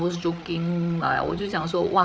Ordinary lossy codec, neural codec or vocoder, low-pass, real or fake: none; codec, 16 kHz, 8 kbps, FreqCodec, larger model; none; fake